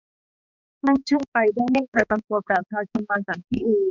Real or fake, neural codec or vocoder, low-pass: fake; codec, 16 kHz, 2 kbps, X-Codec, HuBERT features, trained on balanced general audio; 7.2 kHz